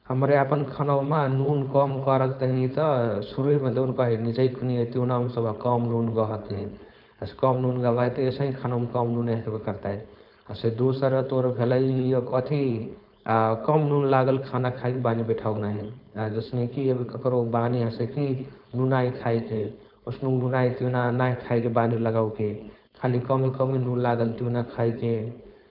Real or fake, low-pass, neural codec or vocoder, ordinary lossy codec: fake; 5.4 kHz; codec, 16 kHz, 4.8 kbps, FACodec; none